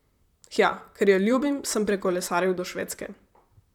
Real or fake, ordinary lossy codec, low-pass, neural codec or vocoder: fake; none; 19.8 kHz; vocoder, 44.1 kHz, 128 mel bands, Pupu-Vocoder